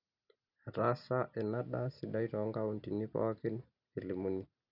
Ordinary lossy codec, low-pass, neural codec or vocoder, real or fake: none; 5.4 kHz; none; real